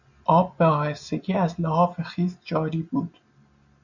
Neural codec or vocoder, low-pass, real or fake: none; 7.2 kHz; real